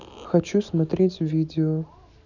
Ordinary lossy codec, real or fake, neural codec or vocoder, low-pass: none; real; none; 7.2 kHz